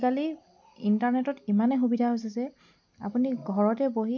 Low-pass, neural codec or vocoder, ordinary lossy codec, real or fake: 7.2 kHz; none; none; real